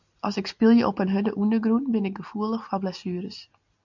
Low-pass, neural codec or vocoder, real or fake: 7.2 kHz; none; real